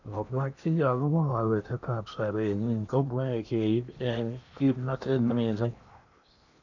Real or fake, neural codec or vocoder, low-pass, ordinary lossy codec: fake; codec, 16 kHz in and 24 kHz out, 0.8 kbps, FocalCodec, streaming, 65536 codes; 7.2 kHz; none